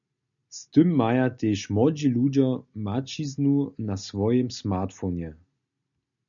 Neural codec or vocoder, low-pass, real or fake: none; 7.2 kHz; real